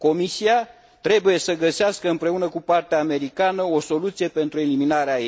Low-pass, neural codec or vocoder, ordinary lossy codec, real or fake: none; none; none; real